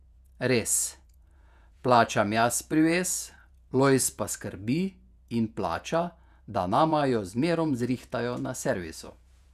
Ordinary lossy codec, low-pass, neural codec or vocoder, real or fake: none; 14.4 kHz; vocoder, 48 kHz, 128 mel bands, Vocos; fake